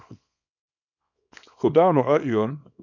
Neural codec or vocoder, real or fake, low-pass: codec, 24 kHz, 0.9 kbps, WavTokenizer, small release; fake; 7.2 kHz